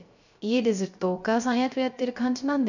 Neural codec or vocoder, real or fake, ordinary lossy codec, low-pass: codec, 16 kHz, 0.3 kbps, FocalCodec; fake; none; 7.2 kHz